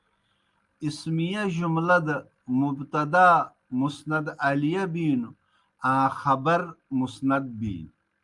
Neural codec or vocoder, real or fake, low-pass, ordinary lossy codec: none; real; 10.8 kHz; Opus, 32 kbps